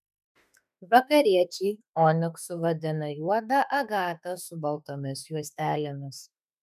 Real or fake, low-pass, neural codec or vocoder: fake; 14.4 kHz; autoencoder, 48 kHz, 32 numbers a frame, DAC-VAE, trained on Japanese speech